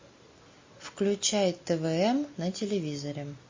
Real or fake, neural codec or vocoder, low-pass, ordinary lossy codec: real; none; 7.2 kHz; MP3, 32 kbps